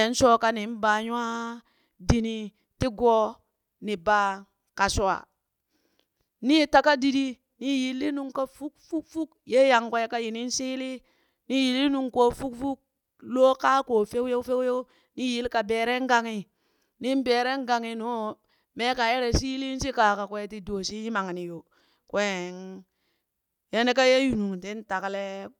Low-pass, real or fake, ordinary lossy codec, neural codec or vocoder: 19.8 kHz; real; none; none